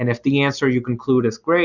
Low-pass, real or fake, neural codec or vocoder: 7.2 kHz; real; none